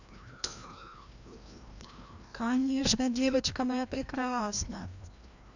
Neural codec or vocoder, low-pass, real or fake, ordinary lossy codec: codec, 16 kHz, 1 kbps, FreqCodec, larger model; 7.2 kHz; fake; none